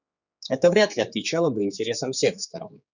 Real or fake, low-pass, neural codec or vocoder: fake; 7.2 kHz; codec, 16 kHz, 4 kbps, X-Codec, HuBERT features, trained on general audio